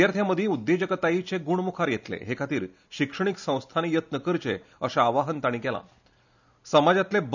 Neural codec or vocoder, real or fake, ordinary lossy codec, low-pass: none; real; none; 7.2 kHz